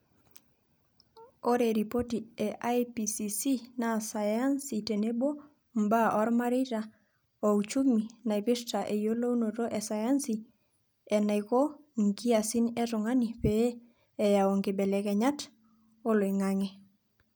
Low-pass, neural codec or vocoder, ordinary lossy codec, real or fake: none; none; none; real